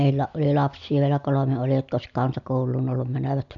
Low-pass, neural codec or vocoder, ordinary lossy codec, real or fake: 7.2 kHz; none; none; real